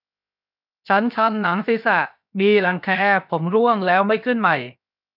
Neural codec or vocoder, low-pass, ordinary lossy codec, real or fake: codec, 16 kHz, 0.7 kbps, FocalCodec; 5.4 kHz; none; fake